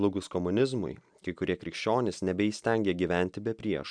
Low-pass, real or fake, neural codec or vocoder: 9.9 kHz; real; none